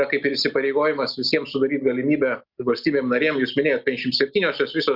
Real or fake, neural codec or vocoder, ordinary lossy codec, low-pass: real; none; Opus, 64 kbps; 5.4 kHz